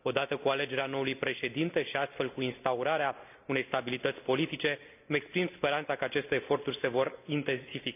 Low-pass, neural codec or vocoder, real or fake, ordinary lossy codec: 3.6 kHz; none; real; none